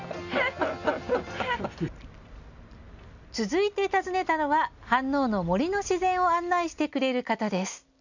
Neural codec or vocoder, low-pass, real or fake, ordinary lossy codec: none; 7.2 kHz; real; none